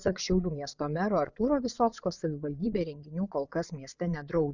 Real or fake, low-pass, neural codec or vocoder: fake; 7.2 kHz; vocoder, 22.05 kHz, 80 mel bands, Vocos